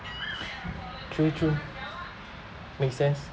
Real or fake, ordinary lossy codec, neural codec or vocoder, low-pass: real; none; none; none